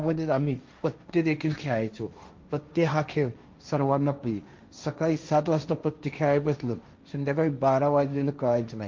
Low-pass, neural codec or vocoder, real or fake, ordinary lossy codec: 7.2 kHz; codec, 16 kHz, 1.1 kbps, Voila-Tokenizer; fake; Opus, 24 kbps